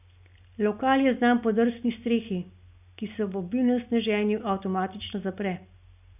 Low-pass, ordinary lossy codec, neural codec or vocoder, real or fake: 3.6 kHz; none; none; real